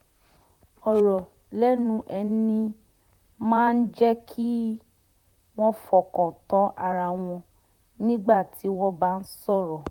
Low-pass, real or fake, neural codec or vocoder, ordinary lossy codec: 19.8 kHz; fake; vocoder, 44.1 kHz, 128 mel bands, Pupu-Vocoder; none